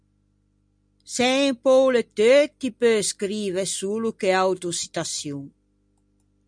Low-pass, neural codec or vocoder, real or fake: 9.9 kHz; none; real